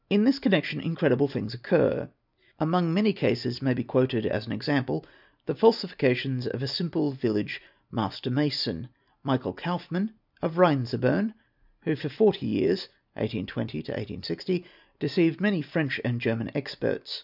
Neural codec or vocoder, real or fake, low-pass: none; real; 5.4 kHz